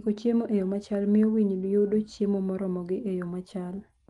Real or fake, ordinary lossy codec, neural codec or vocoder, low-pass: real; Opus, 24 kbps; none; 10.8 kHz